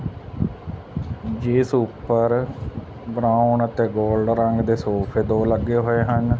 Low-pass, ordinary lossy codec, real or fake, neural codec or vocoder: none; none; real; none